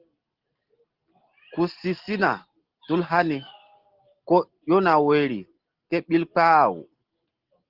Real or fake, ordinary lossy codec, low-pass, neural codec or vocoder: real; Opus, 16 kbps; 5.4 kHz; none